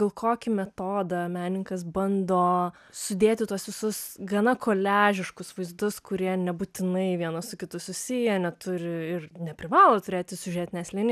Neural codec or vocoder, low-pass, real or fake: none; 14.4 kHz; real